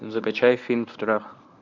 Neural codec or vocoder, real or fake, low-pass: codec, 24 kHz, 0.9 kbps, WavTokenizer, medium speech release version 1; fake; 7.2 kHz